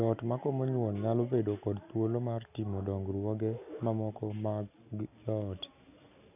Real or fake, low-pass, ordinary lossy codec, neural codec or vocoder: real; 3.6 kHz; none; none